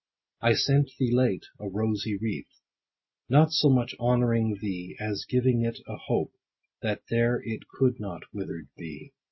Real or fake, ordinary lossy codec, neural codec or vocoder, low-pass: real; MP3, 24 kbps; none; 7.2 kHz